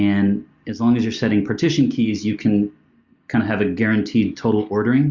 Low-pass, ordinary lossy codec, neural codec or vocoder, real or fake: 7.2 kHz; Opus, 64 kbps; none; real